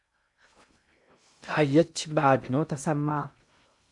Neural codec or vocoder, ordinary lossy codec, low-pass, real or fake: codec, 16 kHz in and 24 kHz out, 0.6 kbps, FocalCodec, streaming, 2048 codes; MP3, 96 kbps; 10.8 kHz; fake